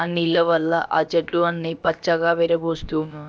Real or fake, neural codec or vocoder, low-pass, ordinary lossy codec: fake; codec, 16 kHz, about 1 kbps, DyCAST, with the encoder's durations; none; none